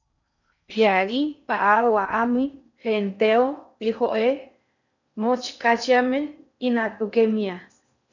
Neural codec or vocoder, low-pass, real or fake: codec, 16 kHz in and 24 kHz out, 0.6 kbps, FocalCodec, streaming, 4096 codes; 7.2 kHz; fake